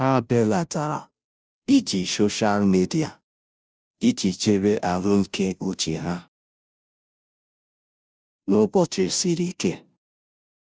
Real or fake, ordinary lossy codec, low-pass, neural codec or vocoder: fake; none; none; codec, 16 kHz, 0.5 kbps, FunCodec, trained on Chinese and English, 25 frames a second